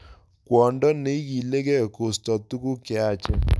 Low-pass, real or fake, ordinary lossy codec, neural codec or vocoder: none; real; none; none